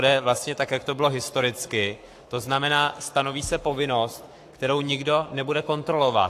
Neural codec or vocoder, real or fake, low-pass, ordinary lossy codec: codec, 44.1 kHz, 7.8 kbps, Pupu-Codec; fake; 14.4 kHz; AAC, 64 kbps